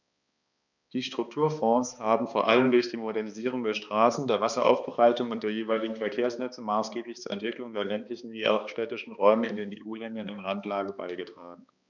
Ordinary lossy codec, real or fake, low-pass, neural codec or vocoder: none; fake; 7.2 kHz; codec, 16 kHz, 2 kbps, X-Codec, HuBERT features, trained on balanced general audio